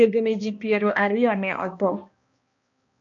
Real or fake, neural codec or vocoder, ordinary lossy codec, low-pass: fake; codec, 16 kHz, 1 kbps, X-Codec, HuBERT features, trained on balanced general audio; MP3, 48 kbps; 7.2 kHz